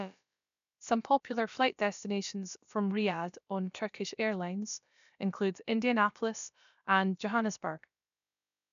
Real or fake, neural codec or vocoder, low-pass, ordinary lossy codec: fake; codec, 16 kHz, about 1 kbps, DyCAST, with the encoder's durations; 7.2 kHz; MP3, 96 kbps